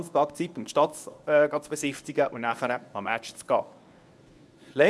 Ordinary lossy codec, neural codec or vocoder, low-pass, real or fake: none; codec, 24 kHz, 0.9 kbps, WavTokenizer, medium speech release version 1; none; fake